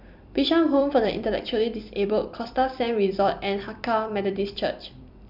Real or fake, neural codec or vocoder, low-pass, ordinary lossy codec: fake; vocoder, 44.1 kHz, 128 mel bands every 256 samples, BigVGAN v2; 5.4 kHz; none